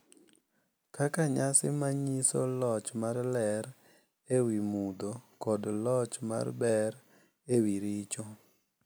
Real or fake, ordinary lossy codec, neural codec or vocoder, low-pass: real; none; none; none